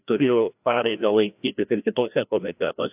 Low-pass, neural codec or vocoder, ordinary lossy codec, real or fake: 3.6 kHz; codec, 16 kHz, 1 kbps, FreqCodec, larger model; AAC, 32 kbps; fake